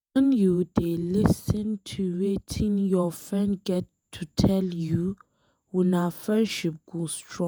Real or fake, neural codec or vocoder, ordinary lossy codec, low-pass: fake; vocoder, 48 kHz, 128 mel bands, Vocos; none; none